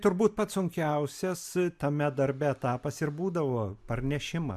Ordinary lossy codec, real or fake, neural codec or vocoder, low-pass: MP3, 96 kbps; real; none; 14.4 kHz